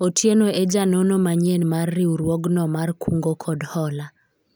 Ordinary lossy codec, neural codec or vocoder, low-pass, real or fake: none; none; none; real